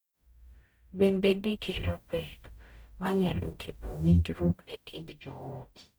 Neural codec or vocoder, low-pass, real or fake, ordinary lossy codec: codec, 44.1 kHz, 0.9 kbps, DAC; none; fake; none